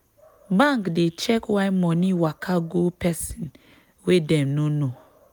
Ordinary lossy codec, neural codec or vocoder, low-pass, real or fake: none; none; none; real